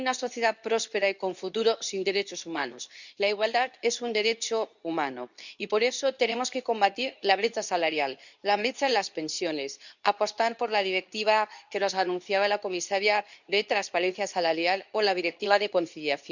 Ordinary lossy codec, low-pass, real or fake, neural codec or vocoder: none; 7.2 kHz; fake; codec, 24 kHz, 0.9 kbps, WavTokenizer, medium speech release version 2